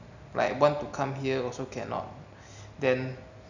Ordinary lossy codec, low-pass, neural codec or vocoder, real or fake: none; 7.2 kHz; none; real